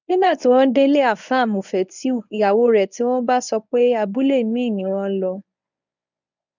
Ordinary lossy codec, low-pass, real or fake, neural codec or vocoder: none; 7.2 kHz; fake; codec, 24 kHz, 0.9 kbps, WavTokenizer, medium speech release version 1